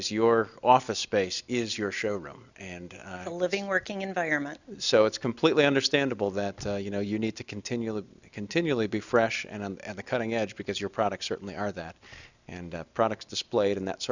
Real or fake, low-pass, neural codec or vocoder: real; 7.2 kHz; none